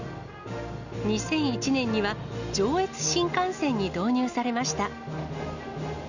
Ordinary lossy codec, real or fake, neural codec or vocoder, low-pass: Opus, 64 kbps; real; none; 7.2 kHz